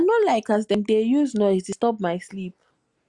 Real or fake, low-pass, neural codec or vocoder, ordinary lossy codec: real; 10.8 kHz; none; Opus, 64 kbps